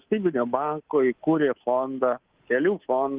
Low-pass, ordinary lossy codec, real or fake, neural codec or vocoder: 3.6 kHz; Opus, 16 kbps; fake; codec, 24 kHz, 3.1 kbps, DualCodec